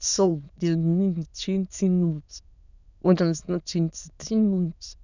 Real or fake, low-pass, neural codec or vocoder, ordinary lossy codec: fake; 7.2 kHz; autoencoder, 22.05 kHz, a latent of 192 numbers a frame, VITS, trained on many speakers; none